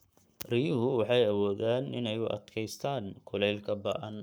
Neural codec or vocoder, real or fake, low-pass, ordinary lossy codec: codec, 44.1 kHz, 7.8 kbps, Pupu-Codec; fake; none; none